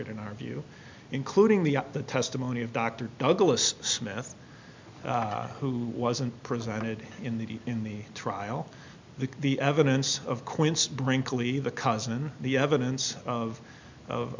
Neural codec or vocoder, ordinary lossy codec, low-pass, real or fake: none; MP3, 64 kbps; 7.2 kHz; real